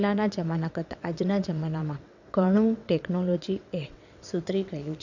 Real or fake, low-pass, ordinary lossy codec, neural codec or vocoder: fake; 7.2 kHz; none; vocoder, 22.05 kHz, 80 mel bands, WaveNeXt